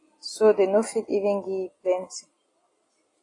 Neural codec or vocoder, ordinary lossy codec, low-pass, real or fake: none; AAC, 32 kbps; 10.8 kHz; real